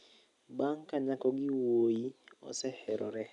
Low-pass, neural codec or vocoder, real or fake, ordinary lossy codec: 10.8 kHz; none; real; none